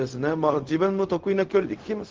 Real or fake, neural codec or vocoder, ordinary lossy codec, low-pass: fake; codec, 16 kHz, 0.4 kbps, LongCat-Audio-Codec; Opus, 16 kbps; 7.2 kHz